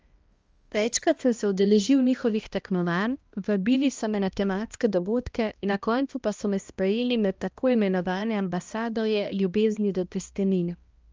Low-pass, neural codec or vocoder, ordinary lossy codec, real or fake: 7.2 kHz; codec, 16 kHz, 1 kbps, X-Codec, HuBERT features, trained on balanced general audio; Opus, 24 kbps; fake